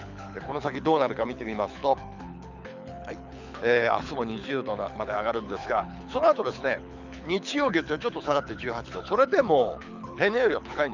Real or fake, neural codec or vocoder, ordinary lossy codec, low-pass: fake; codec, 24 kHz, 6 kbps, HILCodec; none; 7.2 kHz